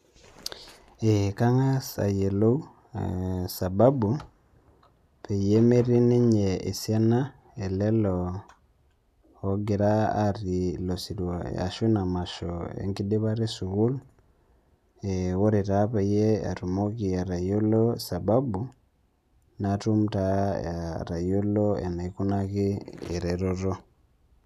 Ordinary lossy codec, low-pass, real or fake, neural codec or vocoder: none; 14.4 kHz; real; none